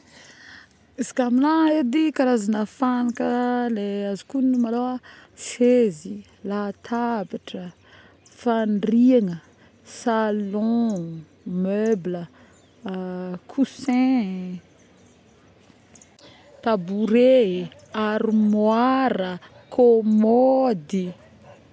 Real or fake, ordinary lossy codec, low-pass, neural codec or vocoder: real; none; none; none